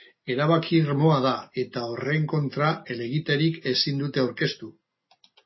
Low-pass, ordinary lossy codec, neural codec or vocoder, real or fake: 7.2 kHz; MP3, 24 kbps; none; real